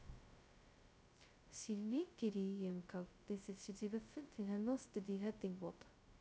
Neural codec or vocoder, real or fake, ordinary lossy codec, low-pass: codec, 16 kHz, 0.2 kbps, FocalCodec; fake; none; none